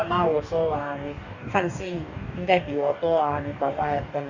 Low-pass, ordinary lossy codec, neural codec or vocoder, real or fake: 7.2 kHz; none; codec, 44.1 kHz, 2.6 kbps, DAC; fake